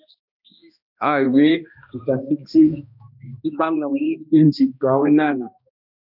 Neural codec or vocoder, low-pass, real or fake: codec, 16 kHz, 1 kbps, X-Codec, HuBERT features, trained on balanced general audio; 5.4 kHz; fake